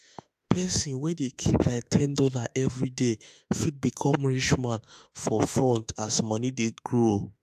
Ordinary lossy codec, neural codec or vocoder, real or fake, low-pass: AAC, 96 kbps; autoencoder, 48 kHz, 32 numbers a frame, DAC-VAE, trained on Japanese speech; fake; 14.4 kHz